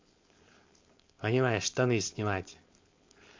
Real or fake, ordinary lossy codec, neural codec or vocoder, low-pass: fake; MP3, 48 kbps; codec, 16 kHz, 4.8 kbps, FACodec; 7.2 kHz